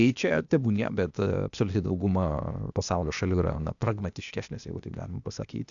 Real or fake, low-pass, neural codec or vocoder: fake; 7.2 kHz; codec, 16 kHz, 0.8 kbps, ZipCodec